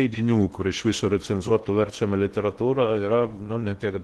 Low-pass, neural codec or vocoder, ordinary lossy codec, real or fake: 10.8 kHz; codec, 16 kHz in and 24 kHz out, 0.8 kbps, FocalCodec, streaming, 65536 codes; Opus, 16 kbps; fake